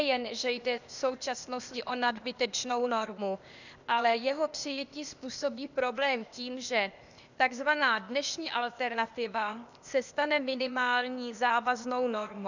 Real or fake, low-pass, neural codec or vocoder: fake; 7.2 kHz; codec, 16 kHz, 0.8 kbps, ZipCodec